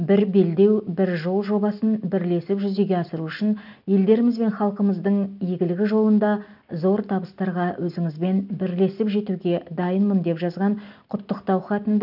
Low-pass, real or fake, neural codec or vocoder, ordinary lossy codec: 5.4 kHz; real; none; none